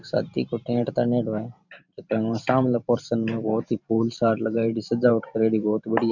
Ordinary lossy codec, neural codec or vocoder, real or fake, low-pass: none; vocoder, 44.1 kHz, 128 mel bands every 512 samples, BigVGAN v2; fake; 7.2 kHz